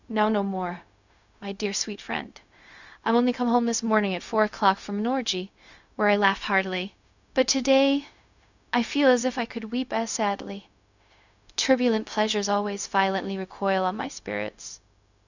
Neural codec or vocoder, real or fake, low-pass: codec, 16 kHz, 0.4 kbps, LongCat-Audio-Codec; fake; 7.2 kHz